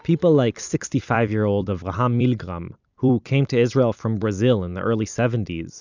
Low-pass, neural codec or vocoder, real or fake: 7.2 kHz; vocoder, 44.1 kHz, 128 mel bands every 256 samples, BigVGAN v2; fake